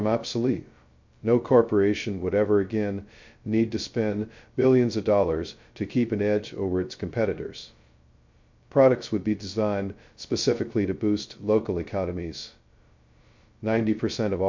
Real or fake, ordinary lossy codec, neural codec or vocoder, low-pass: fake; MP3, 48 kbps; codec, 16 kHz, 0.2 kbps, FocalCodec; 7.2 kHz